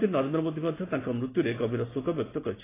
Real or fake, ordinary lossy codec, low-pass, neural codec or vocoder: real; AAC, 16 kbps; 3.6 kHz; none